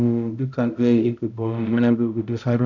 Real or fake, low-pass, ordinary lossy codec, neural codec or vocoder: fake; 7.2 kHz; none; codec, 16 kHz, 0.5 kbps, X-Codec, HuBERT features, trained on balanced general audio